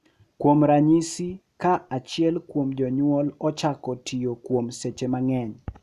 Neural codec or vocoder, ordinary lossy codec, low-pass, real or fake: none; none; 14.4 kHz; real